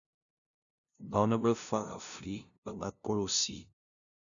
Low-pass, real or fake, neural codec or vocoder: 7.2 kHz; fake; codec, 16 kHz, 0.5 kbps, FunCodec, trained on LibriTTS, 25 frames a second